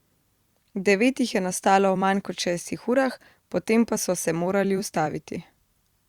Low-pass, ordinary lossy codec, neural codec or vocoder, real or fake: 19.8 kHz; Opus, 64 kbps; vocoder, 44.1 kHz, 128 mel bands every 256 samples, BigVGAN v2; fake